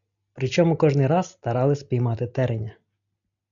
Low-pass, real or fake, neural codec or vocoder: 7.2 kHz; real; none